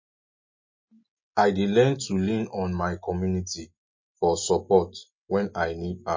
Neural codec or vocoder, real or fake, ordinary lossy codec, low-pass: none; real; MP3, 32 kbps; 7.2 kHz